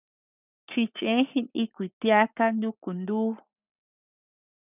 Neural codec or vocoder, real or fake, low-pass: codec, 44.1 kHz, 7.8 kbps, Pupu-Codec; fake; 3.6 kHz